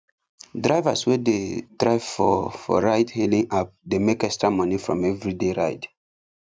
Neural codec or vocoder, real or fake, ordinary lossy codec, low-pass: none; real; none; none